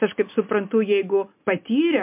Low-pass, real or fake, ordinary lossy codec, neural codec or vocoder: 3.6 kHz; real; MP3, 32 kbps; none